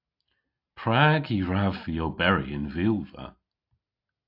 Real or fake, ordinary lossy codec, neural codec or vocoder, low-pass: real; AAC, 48 kbps; none; 5.4 kHz